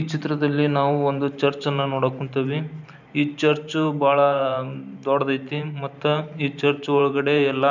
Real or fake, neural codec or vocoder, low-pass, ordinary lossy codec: fake; vocoder, 44.1 kHz, 128 mel bands every 512 samples, BigVGAN v2; 7.2 kHz; none